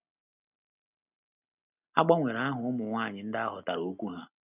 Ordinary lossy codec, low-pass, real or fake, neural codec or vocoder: none; 3.6 kHz; real; none